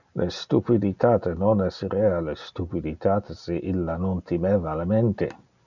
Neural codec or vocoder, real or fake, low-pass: none; real; 7.2 kHz